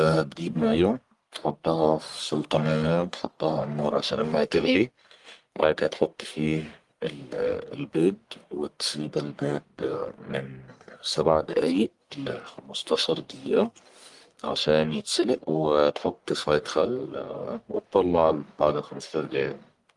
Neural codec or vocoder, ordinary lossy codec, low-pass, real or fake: codec, 44.1 kHz, 1.7 kbps, Pupu-Codec; Opus, 32 kbps; 10.8 kHz; fake